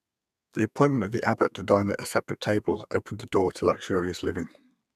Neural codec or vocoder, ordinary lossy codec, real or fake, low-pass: codec, 32 kHz, 1.9 kbps, SNAC; none; fake; 14.4 kHz